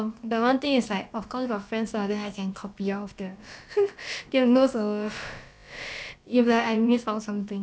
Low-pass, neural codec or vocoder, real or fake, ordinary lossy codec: none; codec, 16 kHz, about 1 kbps, DyCAST, with the encoder's durations; fake; none